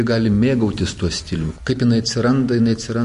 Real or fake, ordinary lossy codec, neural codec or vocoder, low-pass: real; MP3, 48 kbps; none; 10.8 kHz